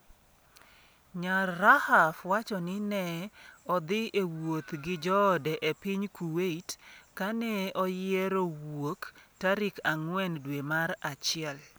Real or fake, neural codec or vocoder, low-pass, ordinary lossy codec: real; none; none; none